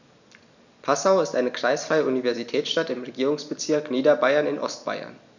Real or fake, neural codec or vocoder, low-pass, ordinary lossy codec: real; none; 7.2 kHz; none